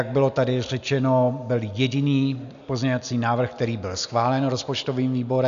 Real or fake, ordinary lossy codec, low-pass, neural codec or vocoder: real; AAC, 96 kbps; 7.2 kHz; none